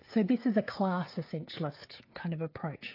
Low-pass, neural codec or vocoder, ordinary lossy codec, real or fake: 5.4 kHz; codec, 16 kHz, 16 kbps, FreqCodec, smaller model; AAC, 32 kbps; fake